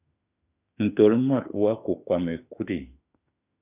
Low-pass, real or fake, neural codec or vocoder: 3.6 kHz; fake; autoencoder, 48 kHz, 32 numbers a frame, DAC-VAE, trained on Japanese speech